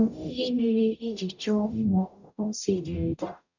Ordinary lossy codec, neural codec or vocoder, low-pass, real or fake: none; codec, 44.1 kHz, 0.9 kbps, DAC; 7.2 kHz; fake